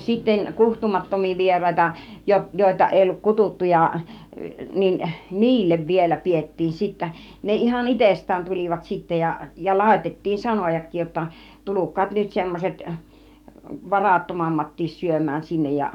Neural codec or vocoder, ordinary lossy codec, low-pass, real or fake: codec, 44.1 kHz, 7.8 kbps, DAC; none; 19.8 kHz; fake